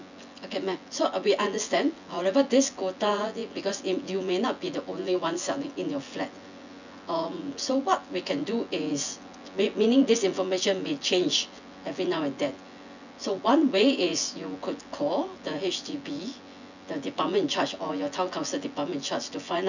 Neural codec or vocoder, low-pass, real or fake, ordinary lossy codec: vocoder, 24 kHz, 100 mel bands, Vocos; 7.2 kHz; fake; none